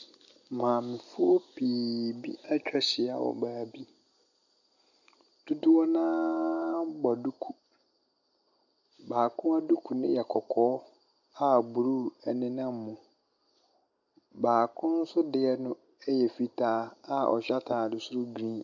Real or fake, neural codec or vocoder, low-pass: real; none; 7.2 kHz